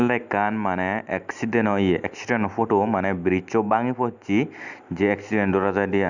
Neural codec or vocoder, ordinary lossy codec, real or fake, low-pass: none; none; real; 7.2 kHz